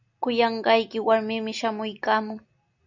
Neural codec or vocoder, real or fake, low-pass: none; real; 7.2 kHz